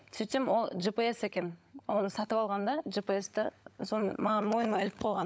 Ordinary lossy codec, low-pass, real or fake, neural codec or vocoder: none; none; fake; codec, 16 kHz, 16 kbps, FunCodec, trained on LibriTTS, 50 frames a second